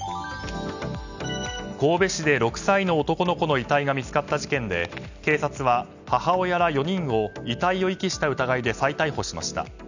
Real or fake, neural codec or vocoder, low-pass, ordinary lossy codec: real; none; 7.2 kHz; none